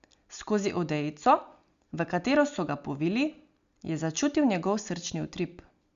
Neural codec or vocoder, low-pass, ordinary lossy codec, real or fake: none; 7.2 kHz; Opus, 64 kbps; real